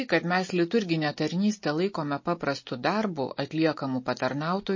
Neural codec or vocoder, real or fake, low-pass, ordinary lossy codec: none; real; 7.2 kHz; MP3, 32 kbps